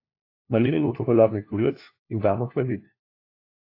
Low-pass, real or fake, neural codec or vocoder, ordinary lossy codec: 5.4 kHz; fake; codec, 16 kHz, 1 kbps, FunCodec, trained on LibriTTS, 50 frames a second; AAC, 32 kbps